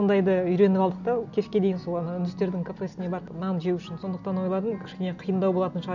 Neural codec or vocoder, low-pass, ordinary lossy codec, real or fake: none; 7.2 kHz; none; real